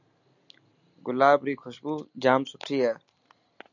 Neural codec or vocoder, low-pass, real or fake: none; 7.2 kHz; real